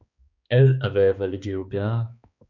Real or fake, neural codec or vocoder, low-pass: fake; codec, 16 kHz, 2 kbps, X-Codec, HuBERT features, trained on balanced general audio; 7.2 kHz